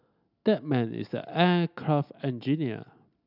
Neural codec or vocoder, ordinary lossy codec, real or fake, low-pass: none; none; real; 5.4 kHz